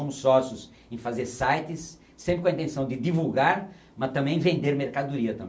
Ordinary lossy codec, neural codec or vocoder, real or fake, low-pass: none; none; real; none